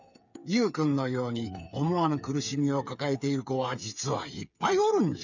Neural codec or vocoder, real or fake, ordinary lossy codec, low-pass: codec, 16 kHz, 8 kbps, FreqCodec, smaller model; fake; none; 7.2 kHz